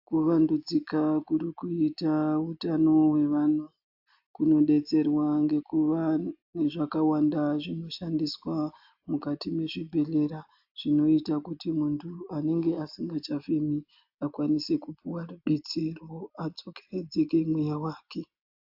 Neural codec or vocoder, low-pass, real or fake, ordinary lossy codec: none; 5.4 kHz; real; AAC, 48 kbps